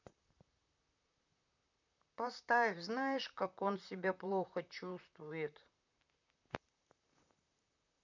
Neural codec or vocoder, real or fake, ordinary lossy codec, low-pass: vocoder, 44.1 kHz, 128 mel bands, Pupu-Vocoder; fake; none; 7.2 kHz